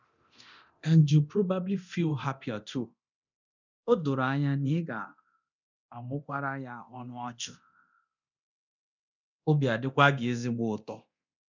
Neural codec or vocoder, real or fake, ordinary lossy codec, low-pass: codec, 24 kHz, 0.9 kbps, DualCodec; fake; none; 7.2 kHz